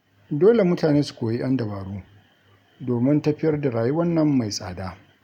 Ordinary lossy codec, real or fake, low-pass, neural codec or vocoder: none; real; 19.8 kHz; none